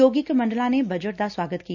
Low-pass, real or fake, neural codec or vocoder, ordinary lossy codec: 7.2 kHz; real; none; none